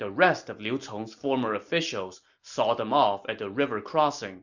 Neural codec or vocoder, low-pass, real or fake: none; 7.2 kHz; real